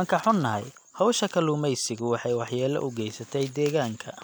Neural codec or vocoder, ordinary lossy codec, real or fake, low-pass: none; none; real; none